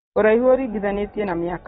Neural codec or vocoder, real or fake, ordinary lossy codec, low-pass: none; real; AAC, 16 kbps; 19.8 kHz